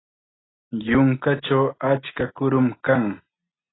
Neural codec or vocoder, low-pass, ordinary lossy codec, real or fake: none; 7.2 kHz; AAC, 16 kbps; real